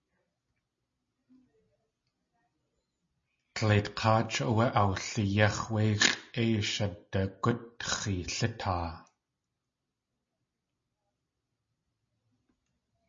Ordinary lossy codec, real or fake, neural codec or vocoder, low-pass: MP3, 32 kbps; real; none; 7.2 kHz